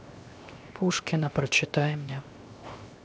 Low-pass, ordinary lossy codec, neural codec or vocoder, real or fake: none; none; codec, 16 kHz, 0.7 kbps, FocalCodec; fake